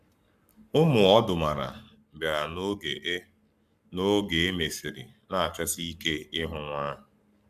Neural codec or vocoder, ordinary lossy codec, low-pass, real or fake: codec, 44.1 kHz, 7.8 kbps, Pupu-Codec; none; 14.4 kHz; fake